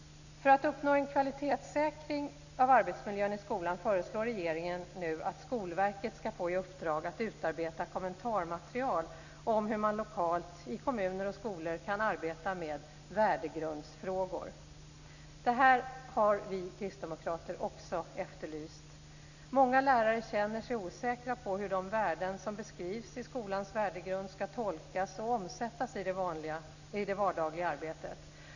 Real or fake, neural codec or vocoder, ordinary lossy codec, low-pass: real; none; none; 7.2 kHz